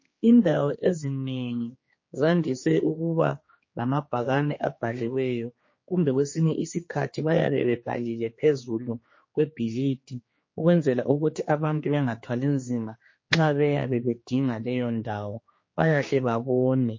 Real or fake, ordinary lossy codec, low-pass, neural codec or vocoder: fake; MP3, 32 kbps; 7.2 kHz; codec, 16 kHz, 2 kbps, X-Codec, HuBERT features, trained on general audio